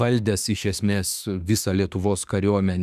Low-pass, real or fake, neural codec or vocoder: 14.4 kHz; fake; autoencoder, 48 kHz, 32 numbers a frame, DAC-VAE, trained on Japanese speech